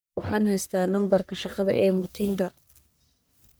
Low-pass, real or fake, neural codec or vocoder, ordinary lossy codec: none; fake; codec, 44.1 kHz, 1.7 kbps, Pupu-Codec; none